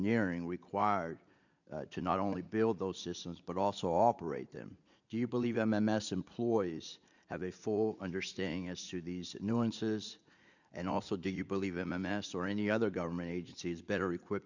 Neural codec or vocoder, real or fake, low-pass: vocoder, 44.1 kHz, 80 mel bands, Vocos; fake; 7.2 kHz